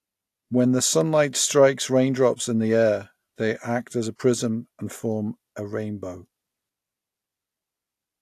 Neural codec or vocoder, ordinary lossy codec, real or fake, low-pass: none; AAC, 64 kbps; real; 14.4 kHz